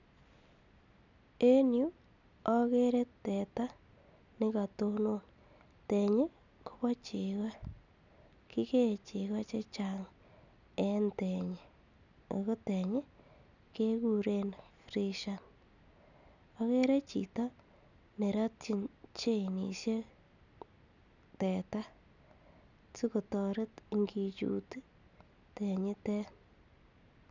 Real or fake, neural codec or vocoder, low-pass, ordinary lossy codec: real; none; 7.2 kHz; none